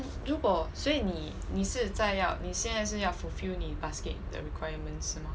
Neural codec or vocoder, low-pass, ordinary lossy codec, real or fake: none; none; none; real